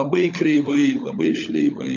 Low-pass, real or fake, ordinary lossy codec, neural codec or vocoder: 7.2 kHz; fake; MP3, 64 kbps; codec, 16 kHz, 16 kbps, FunCodec, trained on LibriTTS, 50 frames a second